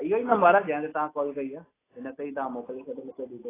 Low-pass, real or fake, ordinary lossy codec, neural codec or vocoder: 3.6 kHz; real; AAC, 16 kbps; none